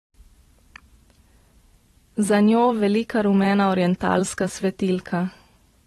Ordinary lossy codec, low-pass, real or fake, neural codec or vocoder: AAC, 32 kbps; 19.8 kHz; fake; vocoder, 44.1 kHz, 128 mel bands every 512 samples, BigVGAN v2